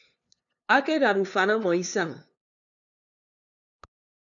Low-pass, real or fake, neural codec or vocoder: 7.2 kHz; fake; codec, 16 kHz, 2 kbps, FunCodec, trained on LibriTTS, 25 frames a second